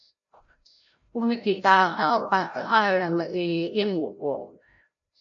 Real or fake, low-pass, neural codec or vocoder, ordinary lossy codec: fake; 7.2 kHz; codec, 16 kHz, 0.5 kbps, FreqCodec, larger model; AAC, 64 kbps